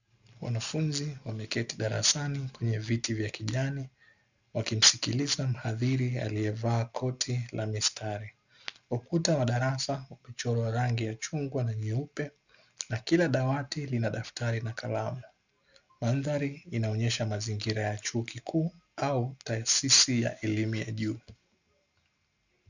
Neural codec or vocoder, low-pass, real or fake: none; 7.2 kHz; real